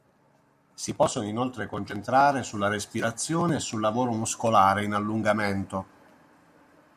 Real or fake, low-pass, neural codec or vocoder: real; 14.4 kHz; none